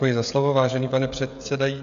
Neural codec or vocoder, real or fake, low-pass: codec, 16 kHz, 16 kbps, FreqCodec, smaller model; fake; 7.2 kHz